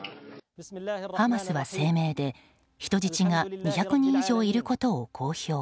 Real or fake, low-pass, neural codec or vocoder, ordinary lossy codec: real; none; none; none